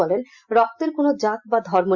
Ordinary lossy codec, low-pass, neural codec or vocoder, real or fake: Opus, 64 kbps; 7.2 kHz; none; real